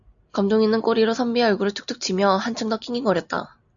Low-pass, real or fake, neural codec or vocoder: 7.2 kHz; real; none